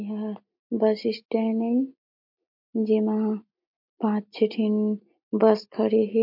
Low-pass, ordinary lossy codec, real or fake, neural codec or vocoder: 5.4 kHz; MP3, 48 kbps; real; none